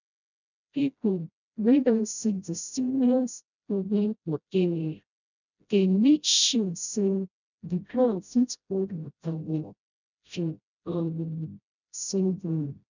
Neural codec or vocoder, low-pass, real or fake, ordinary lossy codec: codec, 16 kHz, 0.5 kbps, FreqCodec, smaller model; 7.2 kHz; fake; none